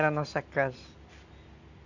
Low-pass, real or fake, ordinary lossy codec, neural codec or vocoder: 7.2 kHz; real; none; none